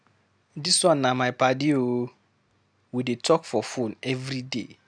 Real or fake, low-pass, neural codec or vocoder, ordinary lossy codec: real; 9.9 kHz; none; none